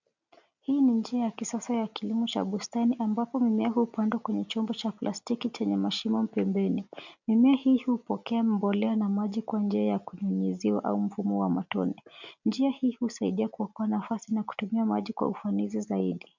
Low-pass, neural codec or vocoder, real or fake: 7.2 kHz; none; real